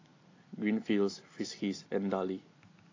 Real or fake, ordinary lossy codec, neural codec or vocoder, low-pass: real; AAC, 32 kbps; none; 7.2 kHz